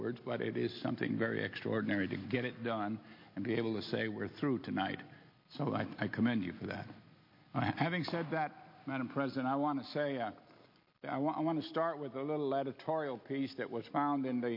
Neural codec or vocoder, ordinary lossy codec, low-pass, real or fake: none; MP3, 32 kbps; 5.4 kHz; real